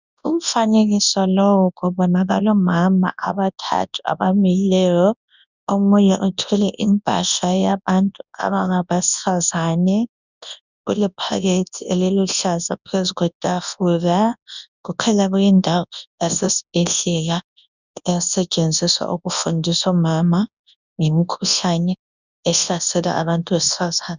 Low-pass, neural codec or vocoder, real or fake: 7.2 kHz; codec, 24 kHz, 0.9 kbps, WavTokenizer, large speech release; fake